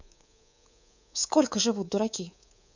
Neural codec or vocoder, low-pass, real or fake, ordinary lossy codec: codec, 24 kHz, 3.1 kbps, DualCodec; 7.2 kHz; fake; none